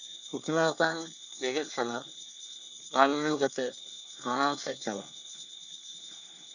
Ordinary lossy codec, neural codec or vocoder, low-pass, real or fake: none; codec, 24 kHz, 1 kbps, SNAC; 7.2 kHz; fake